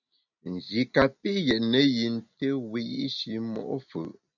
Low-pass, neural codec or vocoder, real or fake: 7.2 kHz; none; real